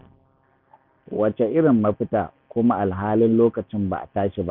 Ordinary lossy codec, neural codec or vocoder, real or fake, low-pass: none; none; real; 5.4 kHz